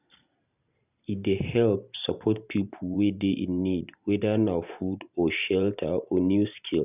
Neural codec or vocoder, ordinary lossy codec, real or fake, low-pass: vocoder, 44.1 kHz, 128 mel bands every 512 samples, BigVGAN v2; none; fake; 3.6 kHz